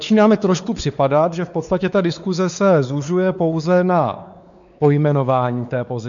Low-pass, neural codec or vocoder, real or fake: 7.2 kHz; codec, 16 kHz, 4 kbps, X-Codec, WavLM features, trained on Multilingual LibriSpeech; fake